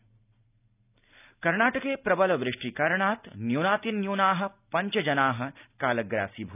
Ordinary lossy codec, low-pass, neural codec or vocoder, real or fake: none; 3.6 kHz; none; real